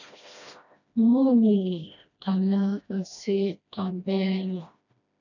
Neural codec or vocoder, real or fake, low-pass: codec, 16 kHz, 1 kbps, FreqCodec, smaller model; fake; 7.2 kHz